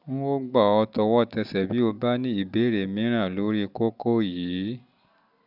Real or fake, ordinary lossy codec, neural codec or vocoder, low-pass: real; none; none; 5.4 kHz